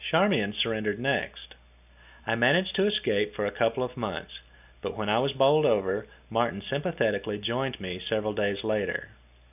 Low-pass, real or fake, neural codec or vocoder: 3.6 kHz; real; none